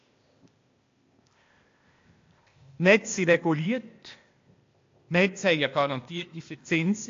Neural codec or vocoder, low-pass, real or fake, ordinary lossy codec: codec, 16 kHz, 0.8 kbps, ZipCodec; 7.2 kHz; fake; AAC, 48 kbps